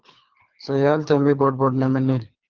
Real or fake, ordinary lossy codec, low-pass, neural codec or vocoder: fake; Opus, 32 kbps; 7.2 kHz; codec, 24 kHz, 3 kbps, HILCodec